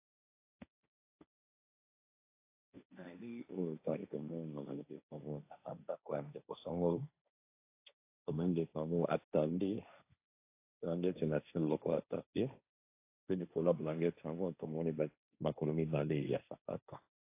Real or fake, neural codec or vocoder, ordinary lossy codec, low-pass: fake; codec, 16 kHz, 1.1 kbps, Voila-Tokenizer; AAC, 24 kbps; 3.6 kHz